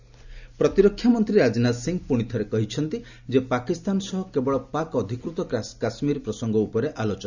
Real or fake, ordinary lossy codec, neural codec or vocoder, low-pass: real; none; none; 7.2 kHz